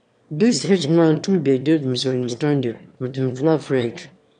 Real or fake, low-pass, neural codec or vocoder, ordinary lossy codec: fake; 9.9 kHz; autoencoder, 22.05 kHz, a latent of 192 numbers a frame, VITS, trained on one speaker; none